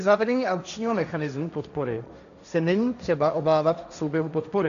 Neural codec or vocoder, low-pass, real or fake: codec, 16 kHz, 1.1 kbps, Voila-Tokenizer; 7.2 kHz; fake